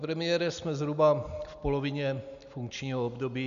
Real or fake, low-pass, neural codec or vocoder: real; 7.2 kHz; none